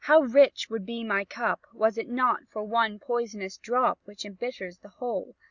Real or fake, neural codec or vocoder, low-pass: real; none; 7.2 kHz